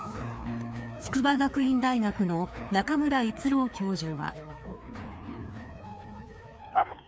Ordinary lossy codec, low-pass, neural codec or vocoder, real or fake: none; none; codec, 16 kHz, 2 kbps, FreqCodec, larger model; fake